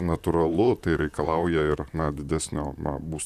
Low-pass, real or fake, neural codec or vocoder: 14.4 kHz; fake; vocoder, 44.1 kHz, 128 mel bands, Pupu-Vocoder